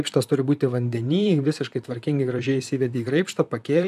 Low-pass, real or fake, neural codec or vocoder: 14.4 kHz; fake; vocoder, 44.1 kHz, 128 mel bands, Pupu-Vocoder